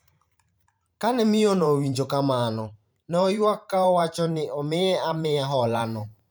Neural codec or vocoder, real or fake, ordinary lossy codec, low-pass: vocoder, 44.1 kHz, 128 mel bands every 512 samples, BigVGAN v2; fake; none; none